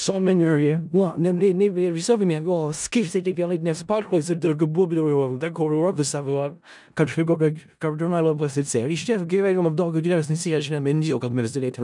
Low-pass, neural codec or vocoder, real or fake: 10.8 kHz; codec, 16 kHz in and 24 kHz out, 0.4 kbps, LongCat-Audio-Codec, four codebook decoder; fake